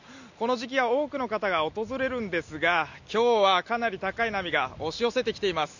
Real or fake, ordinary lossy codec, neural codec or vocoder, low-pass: real; none; none; 7.2 kHz